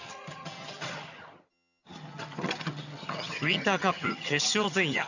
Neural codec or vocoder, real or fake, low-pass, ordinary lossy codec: vocoder, 22.05 kHz, 80 mel bands, HiFi-GAN; fake; 7.2 kHz; none